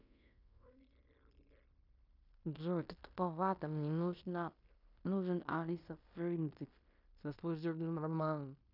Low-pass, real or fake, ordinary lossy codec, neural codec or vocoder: 5.4 kHz; fake; none; codec, 16 kHz in and 24 kHz out, 0.9 kbps, LongCat-Audio-Codec, fine tuned four codebook decoder